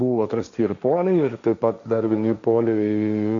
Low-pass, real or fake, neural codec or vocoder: 7.2 kHz; fake; codec, 16 kHz, 1.1 kbps, Voila-Tokenizer